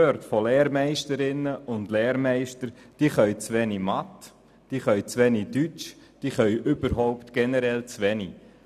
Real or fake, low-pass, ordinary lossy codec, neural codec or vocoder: real; 14.4 kHz; none; none